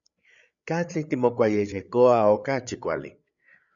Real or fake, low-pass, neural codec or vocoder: fake; 7.2 kHz; codec, 16 kHz, 8 kbps, FreqCodec, larger model